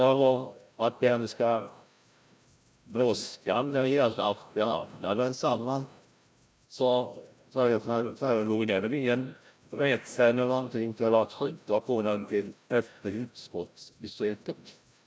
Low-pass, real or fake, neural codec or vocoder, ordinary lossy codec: none; fake; codec, 16 kHz, 0.5 kbps, FreqCodec, larger model; none